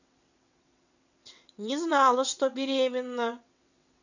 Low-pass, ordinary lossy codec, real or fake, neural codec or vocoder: 7.2 kHz; none; fake; vocoder, 22.05 kHz, 80 mel bands, WaveNeXt